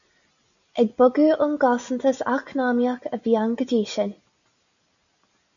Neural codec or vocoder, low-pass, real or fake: none; 7.2 kHz; real